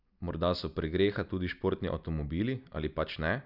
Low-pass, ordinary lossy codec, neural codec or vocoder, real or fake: 5.4 kHz; none; none; real